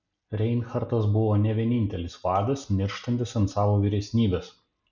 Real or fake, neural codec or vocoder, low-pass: real; none; 7.2 kHz